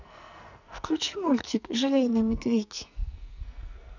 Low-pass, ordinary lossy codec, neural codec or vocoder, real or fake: 7.2 kHz; none; codec, 44.1 kHz, 2.6 kbps, SNAC; fake